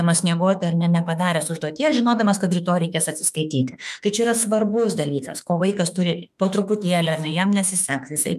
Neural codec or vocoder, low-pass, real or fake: autoencoder, 48 kHz, 32 numbers a frame, DAC-VAE, trained on Japanese speech; 14.4 kHz; fake